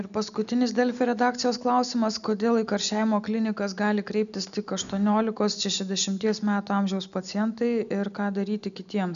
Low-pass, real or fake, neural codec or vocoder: 7.2 kHz; real; none